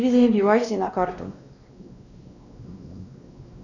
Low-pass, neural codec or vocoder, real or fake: 7.2 kHz; codec, 16 kHz, 1 kbps, X-Codec, WavLM features, trained on Multilingual LibriSpeech; fake